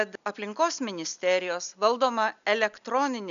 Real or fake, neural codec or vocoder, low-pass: real; none; 7.2 kHz